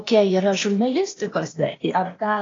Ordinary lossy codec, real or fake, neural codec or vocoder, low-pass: AAC, 32 kbps; fake; codec, 16 kHz, 0.8 kbps, ZipCodec; 7.2 kHz